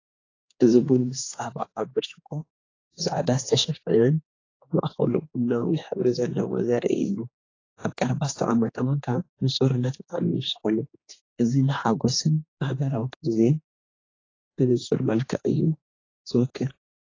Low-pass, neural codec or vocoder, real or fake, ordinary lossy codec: 7.2 kHz; codec, 16 kHz, 2 kbps, X-Codec, HuBERT features, trained on general audio; fake; AAC, 32 kbps